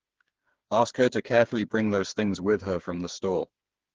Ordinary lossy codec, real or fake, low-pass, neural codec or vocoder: Opus, 16 kbps; fake; 7.2 kHz; codec, 16 kHz, 4 kbps, FreqCodec, smaller model